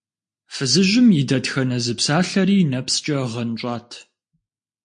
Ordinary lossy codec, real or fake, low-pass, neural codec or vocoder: MP3, 48 kbps; real; 9.9 kHz; none